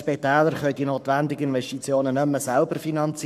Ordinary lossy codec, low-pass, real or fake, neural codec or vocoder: none; 14.4 kHz; fake; codec, 44.1 kHz, 7.8 kbps, Pupu-Codec